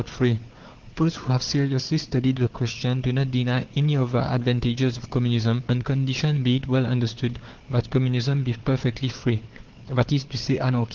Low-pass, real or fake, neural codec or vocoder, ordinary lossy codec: 7.2 kHz; fake; codec, 16 kHz, 4 kbps, FunCodec, trained on Chinese and English, 50 frames a second; Opus, 16 kbps